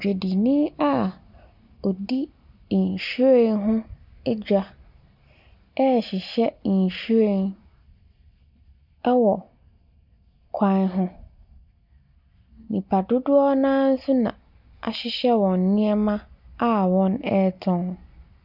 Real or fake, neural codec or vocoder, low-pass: real; none; 5.4 kHz